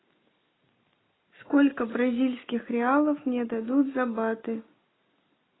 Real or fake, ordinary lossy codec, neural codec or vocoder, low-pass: real; AAC, 16 kbps; none; 7.2 kHz